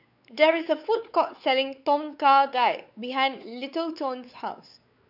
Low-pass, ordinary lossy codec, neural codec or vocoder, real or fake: 5.4 kHz; none; codec, 16 kHz, 4 kbps, X-Codec, WavLM features, trained on Multilingual LibriSpeech; fake